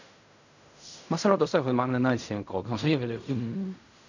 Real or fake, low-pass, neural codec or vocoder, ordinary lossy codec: fake; 7.2 kHz; codec, 16 kHz in and 24 kHz out, 0.4 kbps, LongCat-Audio-Codec, fine tuned four codebook decoder; none